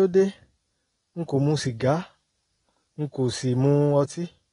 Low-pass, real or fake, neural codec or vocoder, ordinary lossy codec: 10.8 kHz; real; none; AAC, 32 kbps